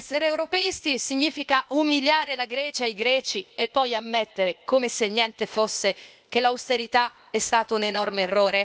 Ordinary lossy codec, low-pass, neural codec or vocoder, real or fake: none; none; codec, 16 kHz, 0.8 kbps, ZipCodec; fake